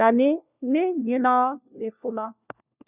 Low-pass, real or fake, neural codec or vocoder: 3.6 kHz; fake; codec, 16 kHz, 1 kbps, FunCodec, trained on LibriTTS, 50 frames a second